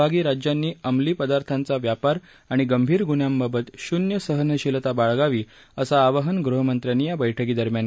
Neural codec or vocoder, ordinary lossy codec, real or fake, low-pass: none; none; real; none